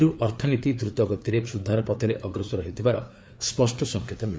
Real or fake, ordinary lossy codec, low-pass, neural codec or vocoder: fake; none; none; codec, 16 kHz, 4 kbps, FreqCodec, larger model